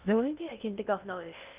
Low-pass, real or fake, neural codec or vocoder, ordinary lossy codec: 3.6 kHz; fake; codec, 16 kHz in and 24 kHz out, 0.6 kbps, FocalCodec, streaming, 2048 codes; Opus, 24 kbps